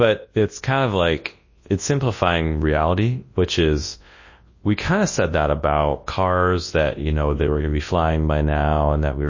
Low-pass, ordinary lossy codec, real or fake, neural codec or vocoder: 7.2 kHz; MP3, 32 kbps; fake; codec, 24 kHz, 0.9 kbps, WavTokenizer, large speech release